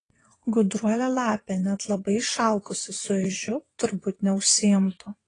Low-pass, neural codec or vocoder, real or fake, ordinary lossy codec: 9.9 kHz; vocoder, 22.05 kHz, 80 mel bands, WaveNeXt; fake; AAC, 32 kbps